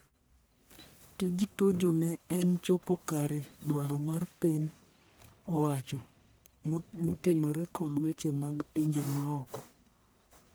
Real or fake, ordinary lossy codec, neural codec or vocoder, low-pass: fake; none; codec, 44.1 kHz, 1.7 kbps, Pupu-Codec; none